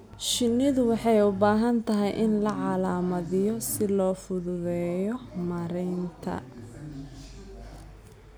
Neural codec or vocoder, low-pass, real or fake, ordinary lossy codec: none; none; real; none